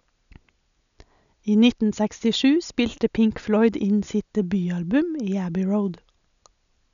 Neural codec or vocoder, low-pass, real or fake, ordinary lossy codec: none; 7.2 kHz; real; none